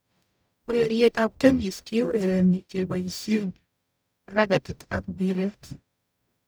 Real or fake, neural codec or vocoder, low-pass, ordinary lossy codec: fake; codec, 44.1 kHz, 0.9 kbps, DAC; none; none